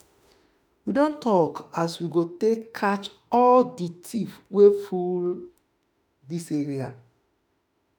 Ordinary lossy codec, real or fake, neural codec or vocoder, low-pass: none; fake; autoencoder, 48 kHz, 32 numbers a frame, DAC-VAE, trained on Japanese speech; none